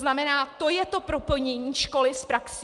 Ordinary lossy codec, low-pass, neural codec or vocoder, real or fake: Opus, 32 kbps; 10.8 kHz; none; real